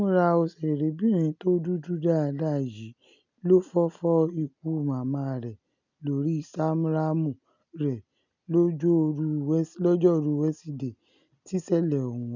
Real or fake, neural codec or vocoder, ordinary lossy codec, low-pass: real; none; none; 7.2 kHz